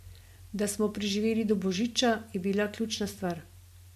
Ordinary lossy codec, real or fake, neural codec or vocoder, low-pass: MP3, 64 kbps; real; none; 14.4 kHz